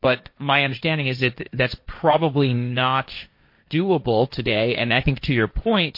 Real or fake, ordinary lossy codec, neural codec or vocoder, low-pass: fake; MP3, 32 kbps; codec, 16 kHz, 1.1 kbps, Voila-Tokenizer; 5.4 kHz